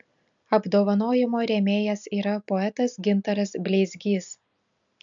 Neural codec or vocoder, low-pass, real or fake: none; 7.2 kHz; real